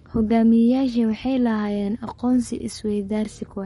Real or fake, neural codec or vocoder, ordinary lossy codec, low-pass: fake; codec, 44.1 kHz, 7.8 kbps, Pupu-Codec; MP3, 48 kbps; 19.8 kHz